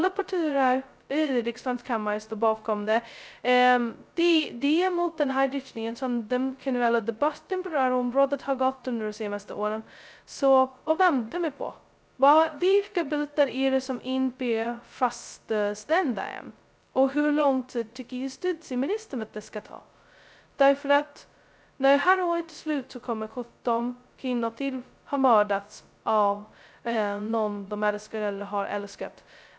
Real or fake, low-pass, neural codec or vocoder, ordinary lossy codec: fake; none; codec, 16 kHz, 0.2 kbps, FocalCodec; none